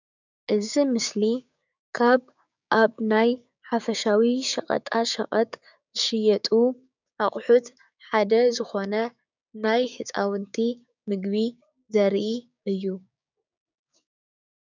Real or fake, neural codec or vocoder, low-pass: fake; codec, 16 kHz, 6 kbps, DAC; 7.2 kHz